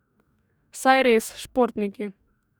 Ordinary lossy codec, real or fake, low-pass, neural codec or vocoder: none; fake; none; codec, 44.1 kHz, 2.6 kbps, DAC